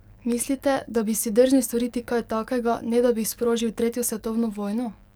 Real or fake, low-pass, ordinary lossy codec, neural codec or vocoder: fake; none; none; codec, 44.1 kHz, 7.8 kbps, DAC